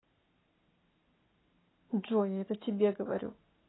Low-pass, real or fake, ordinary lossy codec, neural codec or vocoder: 7.2 kHz; real; AAC, 16 kbps; none